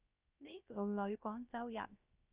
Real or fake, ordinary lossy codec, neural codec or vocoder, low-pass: fake; Opus, 64 kbps; codec, 16 kHz, 0.3 kbps, FocalCodec; 3.6 kHz